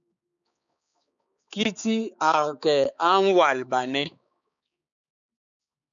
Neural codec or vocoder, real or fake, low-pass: codec, 16 kHz, 4 kbps, X-Codec, HuBERT features, trained on balanced general audio; fake; 7.2 kHz